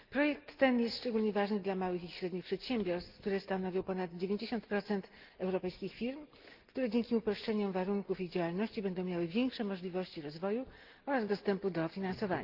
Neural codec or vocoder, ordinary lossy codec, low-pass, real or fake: none; Opus, 32 kbps; 5.4 kHz; real